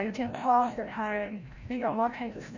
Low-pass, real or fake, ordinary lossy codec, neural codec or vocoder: 7.2 kHz; fake; none; codec, 16 kHz, 0.5 kbps, FreqCodec, larger model